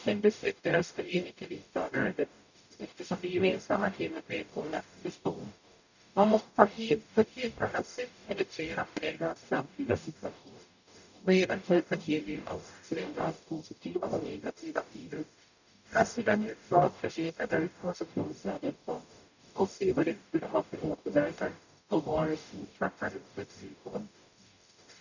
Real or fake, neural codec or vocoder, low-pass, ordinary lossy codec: fake; codec, 44.1 kHz, 0.9 kbps, DAC; 7.2 kHz; none